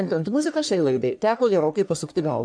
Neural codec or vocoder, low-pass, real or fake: codec, 44.1 kHz, 1.7 kbps, Pupu-Codec; 9.9 kHz; fake